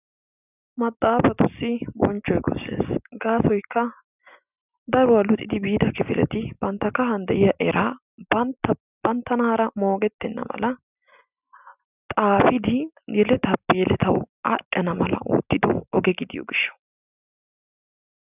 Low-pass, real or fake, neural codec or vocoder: 3.6 kHz; real; none